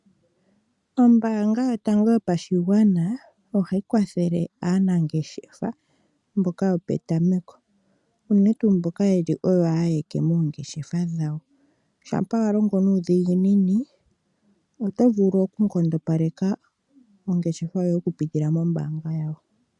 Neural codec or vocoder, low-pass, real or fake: none; 10.8 kHz; real